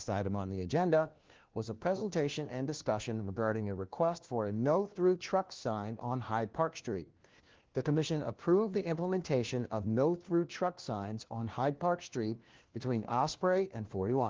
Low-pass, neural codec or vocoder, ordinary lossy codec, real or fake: 7.2 kHz; codec, 16 kHz, 1 kbps, FunCodec, trained on LibriTTS, 50 frames a second; Opus, 16 kbps; fake